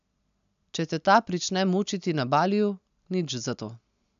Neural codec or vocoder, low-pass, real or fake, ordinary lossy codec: none; 7.2 kHz; real; AAC, 96 kbps